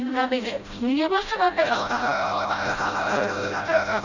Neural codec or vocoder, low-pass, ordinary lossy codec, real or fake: codec, 16 kHz, 0.5 kbps, FreqCodec, smaller model; 7.2 kHz; none; fake